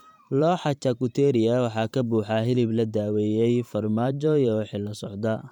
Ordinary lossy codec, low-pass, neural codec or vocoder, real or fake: MP3, 96 kbps; 19.8 kHz; vocoder, 44.1 kHz, 128 mel bands every 512 samples, BigVGAN v2; fake